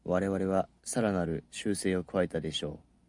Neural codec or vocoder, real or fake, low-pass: none; real; 10.8 kHz